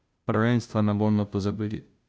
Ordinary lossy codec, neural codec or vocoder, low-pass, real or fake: none; codec, 16 kHz, 0.5 kbps, FunCodec, trained on Chinese and English, 25 frames a second; none; fake